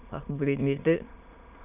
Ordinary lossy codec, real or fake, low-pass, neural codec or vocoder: none; fake; 3.6 kHz; autoencoder, 22.05 kHz, a latent of 192 numbers a frame, VITS, trained on many speakers